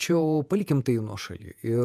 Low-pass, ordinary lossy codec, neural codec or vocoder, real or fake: 14.4 kHz; MP3, 96 kbps; vocoder, 48 kHz, 128 mel bands, Vocos; fake